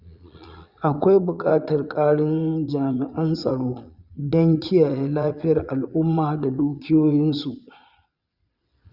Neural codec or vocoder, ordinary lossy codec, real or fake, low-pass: vocoder, 22.05 kHz, 80 mel bands, Vocos; Opus, 64 kbps; fake; 5.4 kHz